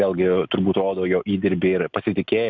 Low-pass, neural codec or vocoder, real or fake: 7.2 kHz; none; real